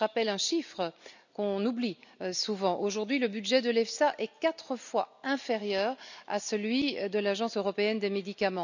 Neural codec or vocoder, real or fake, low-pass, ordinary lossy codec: none; real; 7.2 kHz; none